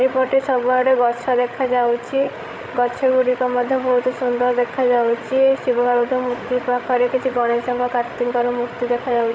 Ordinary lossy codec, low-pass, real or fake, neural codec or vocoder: none; none; fake; codec, 16 kHz, 16 kbps, FreqCodec, larger model